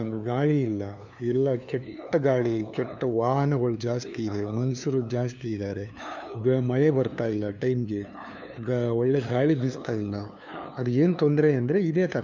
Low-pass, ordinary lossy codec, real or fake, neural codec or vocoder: 7.2 kHz; none; fake; codec, 16 kHz, 2 kbps, FunCodec, trained on LibriTTS, 25 frames a second